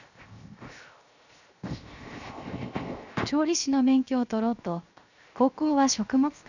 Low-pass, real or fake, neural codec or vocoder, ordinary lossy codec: 7.2 kHz; fake; codec, 16 kHz, 0.7 kbps, FocalCodec; none